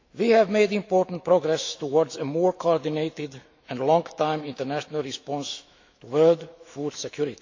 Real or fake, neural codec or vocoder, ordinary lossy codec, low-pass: fake; autoencoder, 48 kHz, 128 numbers a frame, DAC-VAE, trained on Japanese speech; AAC, 48 kbps; 7.2 kHz